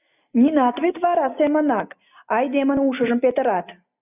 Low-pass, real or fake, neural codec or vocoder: 3.6 kHz; real; none